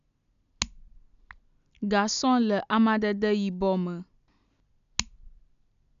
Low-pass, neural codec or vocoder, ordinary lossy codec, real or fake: 7.2 kHz; none; none; real